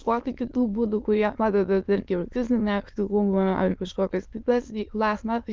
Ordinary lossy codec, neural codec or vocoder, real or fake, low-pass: Opus, 32 kbps; autoencoder, 22.05 kHz, a latent of 192 numbers a frame, VITS, trained on many speakers; fake; 7.2 kHz